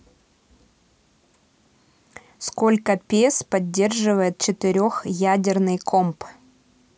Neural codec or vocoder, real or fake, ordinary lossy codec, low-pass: none; real; none; none